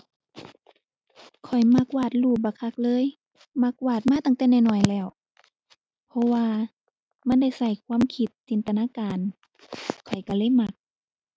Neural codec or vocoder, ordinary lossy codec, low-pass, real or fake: none; none; none; real